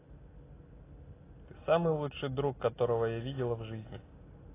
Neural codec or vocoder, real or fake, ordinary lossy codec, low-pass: none; real; AAC, 16 kbps; 3.6 kHz